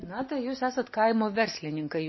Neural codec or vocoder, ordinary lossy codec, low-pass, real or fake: none; MP3, 24 kbps; 7.2 kHz; real